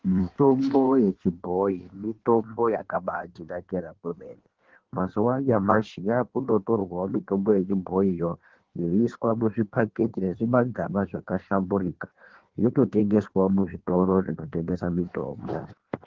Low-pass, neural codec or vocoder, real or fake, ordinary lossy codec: 7.2 kHz; codec, 16 kHz in and 24 kHz out, 1.1 kbps, FireRedTTS-2 codec; fake; Opus, 16 kbps